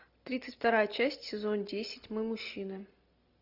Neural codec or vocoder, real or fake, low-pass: none; real; 5.4 kHz